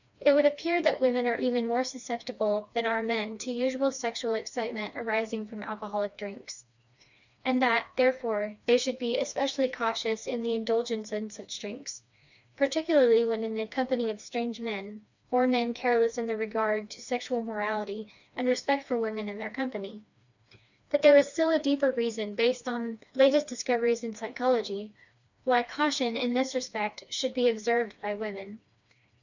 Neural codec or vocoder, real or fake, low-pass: codec, 16 kHz, 2 kbps, FreqCodec, smaller model; fake; 7.2 kHz